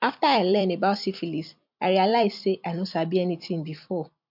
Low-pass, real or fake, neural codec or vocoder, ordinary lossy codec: 5.4 kHz; fake; vocoder, 44.1 kHz, 128 mel bands every 256 samples, BigVGAN v2; MP3, 48 kbps